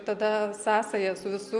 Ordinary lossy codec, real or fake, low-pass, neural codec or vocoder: Opus, 64 kbps; real; 10.8 kHz; none